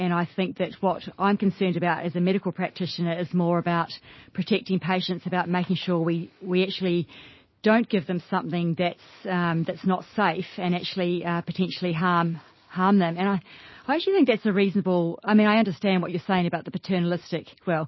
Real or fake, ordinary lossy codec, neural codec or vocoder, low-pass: real; MP3, 24 kbps; none; 7.2 kHz